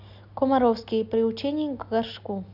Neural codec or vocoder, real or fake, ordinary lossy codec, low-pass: none; real; none; 5.4 kHz